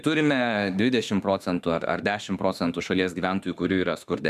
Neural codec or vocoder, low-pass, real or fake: autoencoder, 48 kHz, 32 numbers a frame, DAC-VAE, trained on Japanese speech; 14.4 kHz; fake